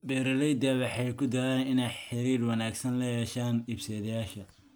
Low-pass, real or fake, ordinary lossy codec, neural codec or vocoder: none; real; none; none